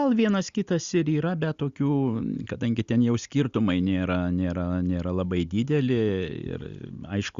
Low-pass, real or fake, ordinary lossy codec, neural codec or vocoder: 7.2 kHz; real; Opus, 64 kbps; none